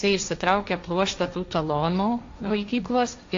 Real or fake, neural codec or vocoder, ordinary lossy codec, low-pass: fake; codec, 16 kHz, 1.1 kbps, Voila-Tokenizer; AAC, 48 kbps; 7.2 kHz